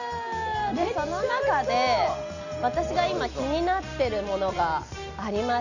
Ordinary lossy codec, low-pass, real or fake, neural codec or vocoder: none; 7.2 kHz; real; none